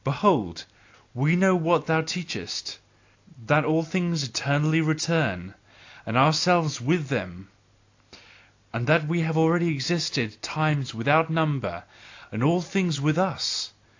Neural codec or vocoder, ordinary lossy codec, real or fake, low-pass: none; AAC, 48 kbps; real; 7.2 kHz